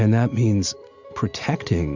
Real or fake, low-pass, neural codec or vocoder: real; 7.2 kHz; none